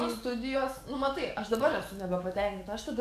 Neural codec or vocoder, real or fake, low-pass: codec, 44.1 kHz, 7.8 kbps, DAC; fake; 14.4 kHz